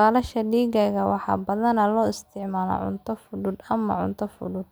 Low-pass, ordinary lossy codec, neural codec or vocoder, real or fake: none; none; none; real